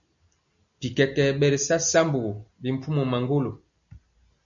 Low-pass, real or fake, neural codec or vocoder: 7.2 kHz; real; none